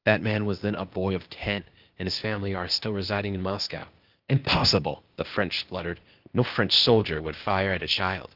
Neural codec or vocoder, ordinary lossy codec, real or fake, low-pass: codec, 16 kHz, 0.8 kbps, ZipCodec; Opus, 24 kbps; fake; 5.4 kHz